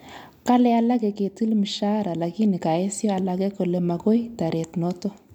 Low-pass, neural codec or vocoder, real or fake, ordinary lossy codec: 19.8 kHz; none; real; MP3, 96 kbps